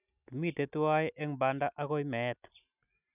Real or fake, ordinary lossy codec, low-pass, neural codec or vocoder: real; none; 3.6 kHz; none